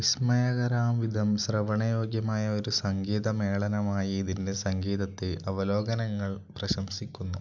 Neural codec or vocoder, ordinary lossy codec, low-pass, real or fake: none; none; 7.2 kHz; real